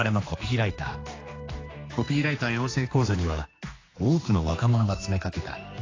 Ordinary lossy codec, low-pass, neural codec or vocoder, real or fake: AAC, 32 kbps; 7.2 kHz; codec, 16 kHz, 2 kbps, X-Codec, HuBERT features, trained on general audio; fake